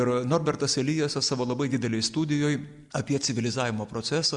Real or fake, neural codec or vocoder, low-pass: real; none; 10.8 kHz